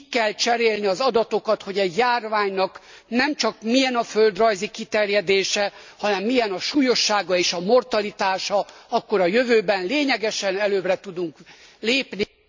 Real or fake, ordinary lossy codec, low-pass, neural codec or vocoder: real; MP3, 64 kbps; 7.2 kHz; none